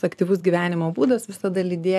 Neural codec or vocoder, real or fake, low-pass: vocoder, 44.1 kHz, 128 mel bands every 256 samples, BigVGAN v2; fake; 14.4 kHz